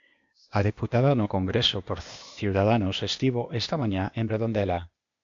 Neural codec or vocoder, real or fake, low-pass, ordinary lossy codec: codec, 16 kHz, 0.8 kbps, ZipCodec; fake; 7.2 kHz; MP3, 48 kbps